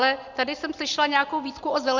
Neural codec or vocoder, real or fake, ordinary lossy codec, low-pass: none; real; Opus, 64 kbps; 7.2 kHz